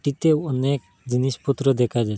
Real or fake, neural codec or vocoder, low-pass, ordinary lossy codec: real; none; none; none